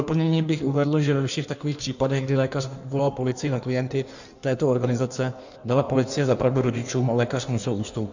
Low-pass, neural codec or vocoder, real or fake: 7.2 kHz; codec, 16 kHz in and 24 kHz out, 1.1 kbps, FireRedTTS-2 codec; fake